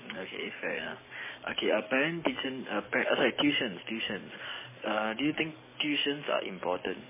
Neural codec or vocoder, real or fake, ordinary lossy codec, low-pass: codec, 44.1 kHz, 7.8 kbps, DAC; fake; MP3, 16 kbps; 3.6 kHz